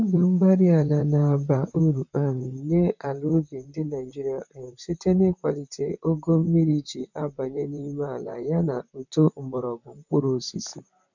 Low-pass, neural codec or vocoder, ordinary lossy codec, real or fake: 7.2 kHz; vocoder, 44.1 kHz, 128 mel bands, Pupu-Vocoder; none; fake